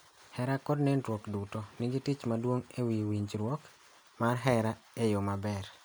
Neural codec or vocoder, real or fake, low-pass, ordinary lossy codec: none; real; none; none